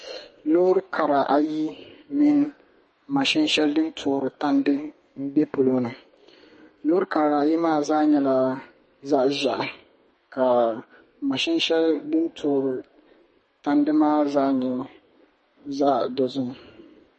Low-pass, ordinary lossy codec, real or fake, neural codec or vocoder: 10.8 kHz; MP3, 32 kbps; fake; codec, 32 kHz, 1.9 kbps, SNAC